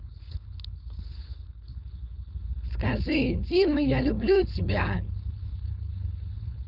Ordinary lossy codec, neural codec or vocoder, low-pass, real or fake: none; codec, 16 kHz, 4.8 kbps, FACodec; 5.4 kHz; fake